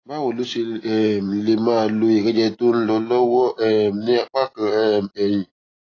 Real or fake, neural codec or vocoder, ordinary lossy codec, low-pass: real; none; AAC, 32 kbps; 7.2 kHz